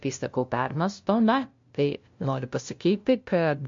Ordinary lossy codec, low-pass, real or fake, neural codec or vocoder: MP3, 48 kbps; 7.2 kHz; fake; codec, 16 kHz, 0.5 kbps, FunCodec, trained on LibriTTS, 25 frames a second